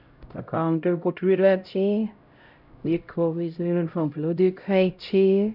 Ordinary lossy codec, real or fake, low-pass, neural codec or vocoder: none; fake; 5.4 kHz; codec, 16 kHz, 0.5 kbps, X-Codec, HuBERT features, trained on LibriSpeech